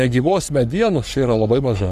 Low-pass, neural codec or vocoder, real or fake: 14.4 kHz; codec, 44.1 kHz, 3.4 kbps, Pupu-Codec; fake